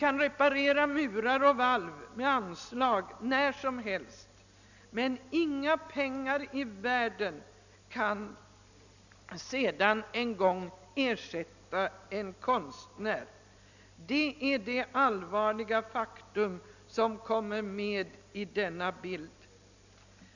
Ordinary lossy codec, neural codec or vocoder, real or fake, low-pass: none; none; real; 7.2 kHz